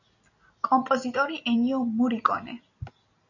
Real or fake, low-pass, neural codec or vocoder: real; 7.2 kHz; none